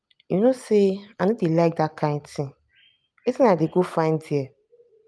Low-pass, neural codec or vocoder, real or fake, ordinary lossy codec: none; none; real; none